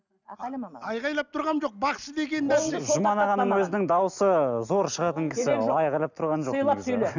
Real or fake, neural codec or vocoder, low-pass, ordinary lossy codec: real; none; 7.2 kHz; none